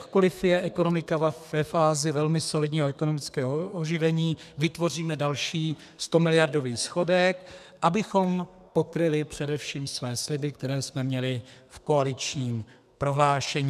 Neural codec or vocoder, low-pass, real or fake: codec, 32 kHz, 1.9 kbps, SNAC; 14.4 kHz; fake